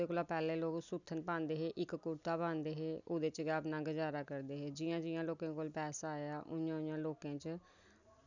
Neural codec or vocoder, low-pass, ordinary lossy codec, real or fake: none; 7.2 kHz; none; real